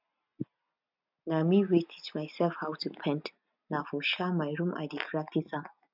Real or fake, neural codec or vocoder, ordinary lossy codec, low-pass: real; none; none; 5.4 kHz